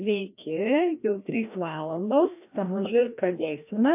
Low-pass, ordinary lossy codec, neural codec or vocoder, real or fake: 3.6 kHz; AAC, 32 kbps; codec, 16 kHz, 1 kbps, FreqCodec, larger model; fake